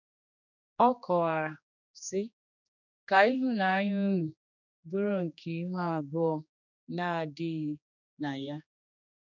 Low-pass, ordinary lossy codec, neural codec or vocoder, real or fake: 7.2 kHz; none; codec, 16 kHz, 2 kbps, X-Codec, HuBERT features, trained on general audio; fake